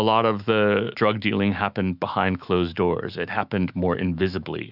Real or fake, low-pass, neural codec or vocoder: fake; 5.4 kHz; autoencoder, 48 kHz, 128 numbers a frame, DAC-VAE, trained on Japanese speech